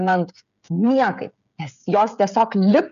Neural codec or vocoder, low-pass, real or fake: codec, 16 kHz, 16 kbps, FreqCodec, smaller model; 7.2 kHz; fake